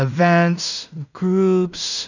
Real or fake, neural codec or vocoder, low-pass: fake; codec, 16 kHz in and 24 kHz out, 0.4 kbps, LongCat-Audio-Codec, two codebook decoder; 7.2 kHz